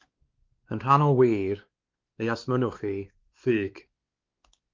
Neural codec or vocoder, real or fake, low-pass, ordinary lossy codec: codec, 16 kHz, 2 kbps, X-Codec, WavLM features, trained on Multilingual LibriSpeech; fake; 7.2 kHz; Opus, 32 kbps